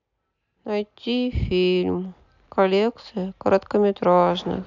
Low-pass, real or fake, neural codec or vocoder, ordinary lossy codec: 7.2 kHz; real; none; none